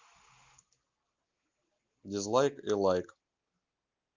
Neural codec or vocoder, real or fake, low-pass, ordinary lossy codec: none; real; 7.2 kHz; Opus, 32 kbps